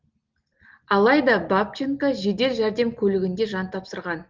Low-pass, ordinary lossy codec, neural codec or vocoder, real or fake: 7.2 kHz; Opus, 32 kbps; none; real